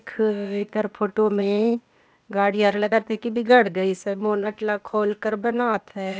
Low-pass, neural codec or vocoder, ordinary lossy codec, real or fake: none; codec, 16 kHz, 0.8 kbps, ZipCodec; none; fake